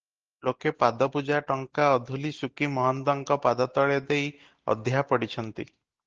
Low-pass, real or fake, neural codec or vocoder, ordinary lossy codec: 7.2 kHz; real; none; Opus, 16 kbps